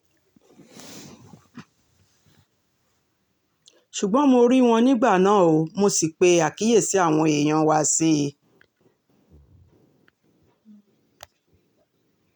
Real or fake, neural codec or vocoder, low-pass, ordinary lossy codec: real; none; 19.8 kHz; none